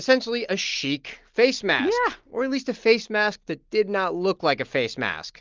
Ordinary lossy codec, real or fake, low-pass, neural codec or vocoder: Opus, 32 kbps; real; 7.2 kHz; none